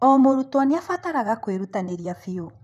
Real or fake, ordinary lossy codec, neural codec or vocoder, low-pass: fake; none; vocoder, 44.1 kHz, 128 mel bands, Pupu-Vocoder; 14.4 kHz